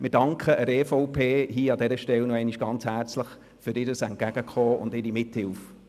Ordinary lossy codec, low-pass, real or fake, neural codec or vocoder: none; 14.4 kHz; real; none